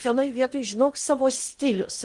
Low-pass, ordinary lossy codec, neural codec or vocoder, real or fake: 10.8 kHz; Opus, 32 kbps; codec, 16 kHz in and 24 kHz out, 0.8 kbps, FocalCodec, streaming, 65536 codes; fake